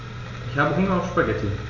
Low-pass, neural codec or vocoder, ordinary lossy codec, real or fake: 7.2 kHz; none; none; real